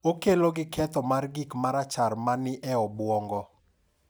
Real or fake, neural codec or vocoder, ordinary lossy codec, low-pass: real; none; none; none